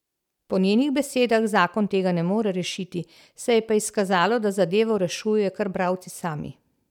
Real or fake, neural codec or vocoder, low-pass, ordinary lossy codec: real; none; 19.8 kHz; none